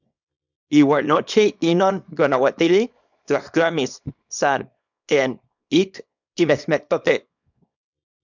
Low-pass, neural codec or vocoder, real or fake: 7.2 kHz; codec, 24 kHz, 0.9 kbps, WavTokenizer, small release; fake